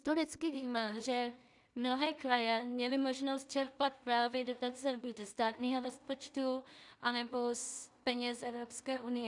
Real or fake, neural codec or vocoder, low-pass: fake; codec, 16 kHz in and 24 kHz out, 0.4 kbps, LongCat-Audio-Codec, two codebook decoder; 10.8 kHz